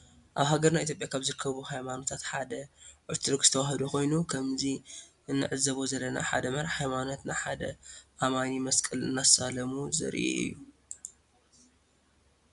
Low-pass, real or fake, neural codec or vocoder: 10.8 kHz; real; none